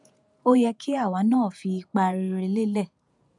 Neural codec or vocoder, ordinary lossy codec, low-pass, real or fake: vocoder, 48 kHz, 128 mel bands, Vocos; none; 10.8 kHz; fake